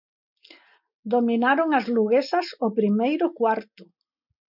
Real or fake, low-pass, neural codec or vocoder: real; 5.4 kHz; none